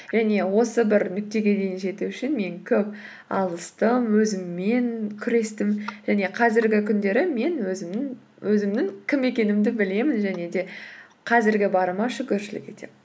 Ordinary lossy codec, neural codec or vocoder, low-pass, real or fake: none; none; none; real